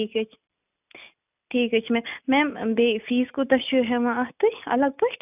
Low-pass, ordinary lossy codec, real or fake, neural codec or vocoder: 3.6 kHz; none; real; none